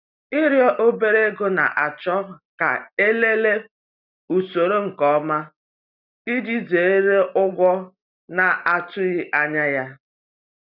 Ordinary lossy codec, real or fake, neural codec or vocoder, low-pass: none; real; none; 5.4 kHz